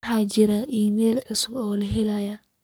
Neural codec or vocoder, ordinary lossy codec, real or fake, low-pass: codec, 44.1 kHz, 3.4 kbps, Pupu-Codec; none; fake; none